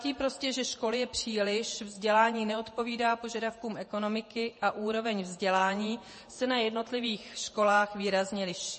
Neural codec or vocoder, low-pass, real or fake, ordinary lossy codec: vocoder, 48 kHz, 128 mel bands, Vocos; 10.8 kHz; fake; MP3, 32 kbps